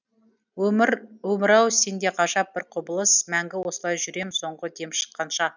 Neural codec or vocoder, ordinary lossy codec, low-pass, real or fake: none; none; 7.2 kHz; real